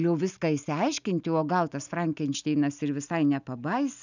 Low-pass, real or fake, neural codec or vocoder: 7.2 kHz; real; none